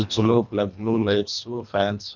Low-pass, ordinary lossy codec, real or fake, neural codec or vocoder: 7.2 kHz; none; fake; codec, 24 kHz, 1.5 kbps, HILCodec